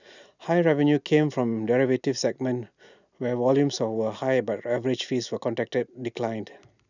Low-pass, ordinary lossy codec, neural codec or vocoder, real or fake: 7.2 kHz; none; none; real